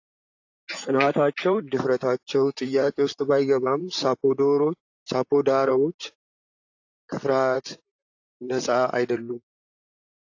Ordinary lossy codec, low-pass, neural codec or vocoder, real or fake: AAC, 48 kbps; 7.2 kHz; vocoder, 44.1 kHz, 128 mel bands, Pupu-Vocoder; fake